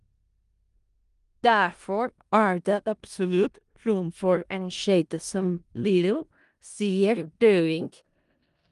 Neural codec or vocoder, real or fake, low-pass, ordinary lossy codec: codec, 16 kHz in and 24 kHz out, 0.4 kbps, LongCat-Audio-Codec, four codebook decoder; fake; 10.8 kHz; Opus, 32 kbps